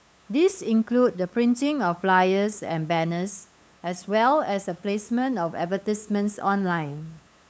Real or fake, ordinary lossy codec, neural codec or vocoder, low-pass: fake; none; codec, 16 kHz, 8 kbps, FunCodec, trained on LibriTTS, 25 frames a second; none